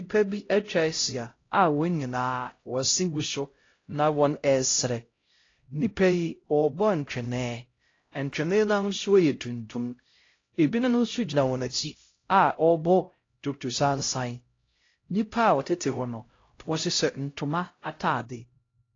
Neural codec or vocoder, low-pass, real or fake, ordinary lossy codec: codec, 16 kHz, 0.5 kbps, X-Codec, HuBERT features, trained on LibriSpeech; 7.2 kHz; fake; AAC, 32 kbps